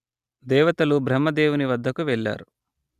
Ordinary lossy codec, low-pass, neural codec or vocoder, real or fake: Opus, 64 kbps; 14.4 kHz; none; real